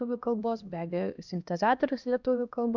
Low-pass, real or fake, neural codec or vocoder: 7.2 kHz; fake; codec, 16 kHz, 1 kbps, X-Codec, HuBERT features, trained on LibriSpeech